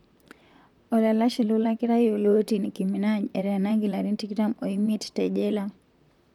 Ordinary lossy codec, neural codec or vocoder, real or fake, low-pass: none; vocoder, 44.1 kHz, 128 mel bands, Pupu-Vocoder; fake; 19.8 kHz